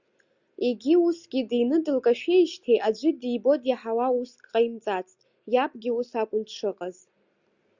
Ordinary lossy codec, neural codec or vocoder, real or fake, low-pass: Opus, 64 kbps; none; real; 7.2 kHz